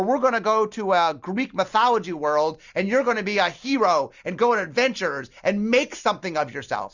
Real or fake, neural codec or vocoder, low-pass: real; none; 7.2 kHz